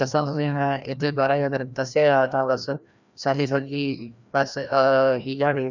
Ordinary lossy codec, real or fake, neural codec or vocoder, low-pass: none; fake; codec, 16 kHz, 1 kbps, FreqCodec, larger model; 7.2 kHz